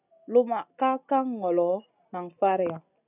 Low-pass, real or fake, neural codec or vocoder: 3.6 kHz; real; none